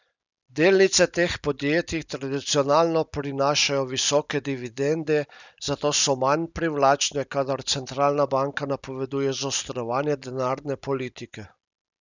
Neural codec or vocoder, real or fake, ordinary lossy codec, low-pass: none; real; none; 7.2 kHz